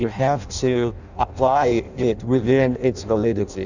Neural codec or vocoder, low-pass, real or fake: codec, 16 kHz in and 24 kHz out, 0.6 kbps, FireRedTTS-2 codec; 7.2 kHz; fake